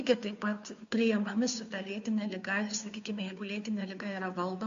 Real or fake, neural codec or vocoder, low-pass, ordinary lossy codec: fake; codec, 16 kHz, 2 kbps, FunCodec, trained on Chinese and English, 25 frames a second; 7.2 kHz; AAC, 64 kbps